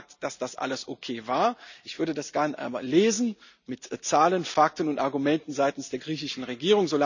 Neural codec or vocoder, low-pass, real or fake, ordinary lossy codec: none; 7.2 kHz; real; none